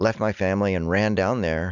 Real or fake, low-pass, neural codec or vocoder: real; 7.2 kHz; none